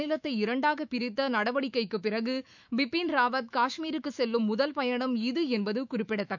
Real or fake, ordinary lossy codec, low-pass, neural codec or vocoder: fake; none; 7.2 kHz; autoencoder, 48 kHz, 128 numbers a frame, DAC-VAE, trained on Japanese speech